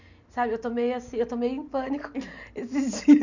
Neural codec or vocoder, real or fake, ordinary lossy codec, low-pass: none; real; none; 7.2 kHz